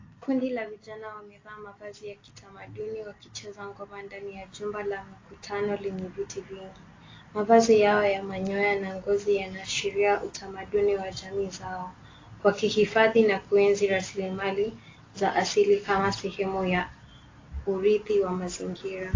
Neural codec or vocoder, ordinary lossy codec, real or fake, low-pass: none; AAC, 32 kbps; real; 7.2 kHz